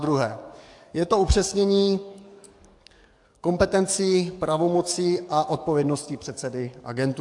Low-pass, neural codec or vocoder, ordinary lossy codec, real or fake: 10.8 kHz; codec, 44.1 kHz, 7.8 kbps, DAC; AAC, 64 kbps; fake